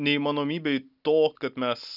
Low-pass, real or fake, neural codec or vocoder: 5.4 kHz; real; none